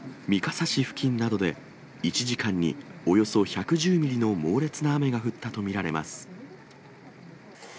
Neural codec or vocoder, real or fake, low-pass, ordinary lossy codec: none; real; none; none